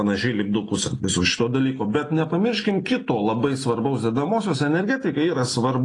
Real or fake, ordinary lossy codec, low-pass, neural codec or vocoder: real; AAC, 32 kbps; 10.8 kHz; none